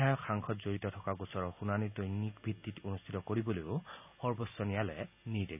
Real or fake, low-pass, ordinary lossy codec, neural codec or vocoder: real; 3.6 kHz; none; none